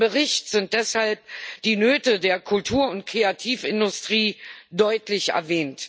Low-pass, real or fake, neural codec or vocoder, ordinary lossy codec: none; real; none; none